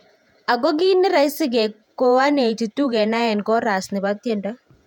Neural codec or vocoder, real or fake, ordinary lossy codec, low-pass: vocoder, 48 kHz, 128 mel bands, Vocos; fake; none; 19.8 kHz